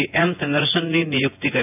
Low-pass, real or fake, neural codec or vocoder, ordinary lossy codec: 3.6 kHz; fake; vocoder, 24 kHz, 100 mel bands, Vocos; AAC, 32 kbps